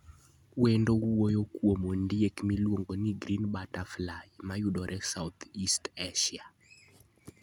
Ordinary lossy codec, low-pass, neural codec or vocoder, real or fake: none; 19.8 kHz; none; real